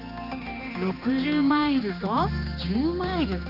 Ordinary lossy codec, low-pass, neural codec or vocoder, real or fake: none; 5.4 kHz; codec, 16 kHz, 2 kbps, X-Codec, HuBERT features, trained on general audio; fake